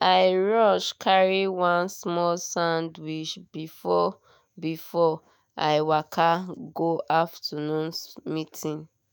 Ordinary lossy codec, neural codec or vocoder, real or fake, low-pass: none; autoencoder, 48 kHz, 128 numbers a frame, DAC-VAE, trained on Japanese speech; fake; none